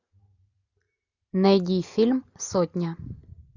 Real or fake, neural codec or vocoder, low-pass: real; none; 7.2 kHz